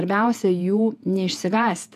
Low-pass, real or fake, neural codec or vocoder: 14.4 kHz; fake; vocoder, 48 kHz, 128 mel bands, Vocos